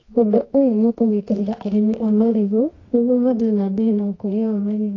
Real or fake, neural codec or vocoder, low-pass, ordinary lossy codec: fake; codec, 24 kHz, 0.9 kbps, WavTokenizer, medium music audio release; 7.2 kHz; AAC, 32 kbps